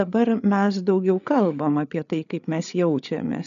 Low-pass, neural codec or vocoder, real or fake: 7.2 kHz; codec, 16 kHz, 8 kbps, FreqCodec, larger model; fake